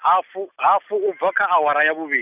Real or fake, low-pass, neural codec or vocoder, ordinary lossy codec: real; 3.6 kHz; none; none